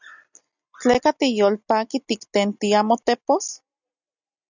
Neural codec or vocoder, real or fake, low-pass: none; real; 7.2 kHz